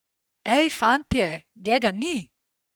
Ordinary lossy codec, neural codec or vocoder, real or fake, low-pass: none; codec, 44.1 kHz, 3.4 kbps, Pupu-Codec; fake; none